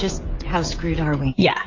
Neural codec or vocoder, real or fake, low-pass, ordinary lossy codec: codec, 16 kHz, 16 kbps, FreqCodec, smaller model; fake; 7.2 kHz; AAC, 32 kbps